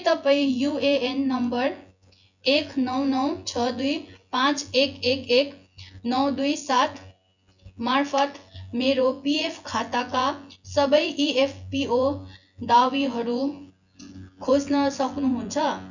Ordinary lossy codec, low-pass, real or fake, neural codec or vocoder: none; 7.2 kHz; fake; vocoder, 24 kHz, 100 mel bands, Vocos